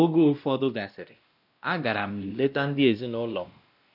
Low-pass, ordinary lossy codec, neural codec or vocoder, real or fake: 5.4 kHz; none; codec, 16 kHz, 1 kbps, X-Codec, WavLM features, trained on Multilingual LibriSpeech; fake